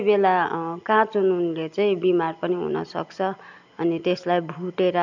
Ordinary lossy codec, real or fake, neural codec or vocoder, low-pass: none; real; none; 7.2 kHz